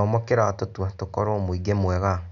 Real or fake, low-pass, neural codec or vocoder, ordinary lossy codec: real; 7.2 kHz; none; none